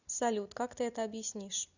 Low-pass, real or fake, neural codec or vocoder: 7.2 kHz; real; none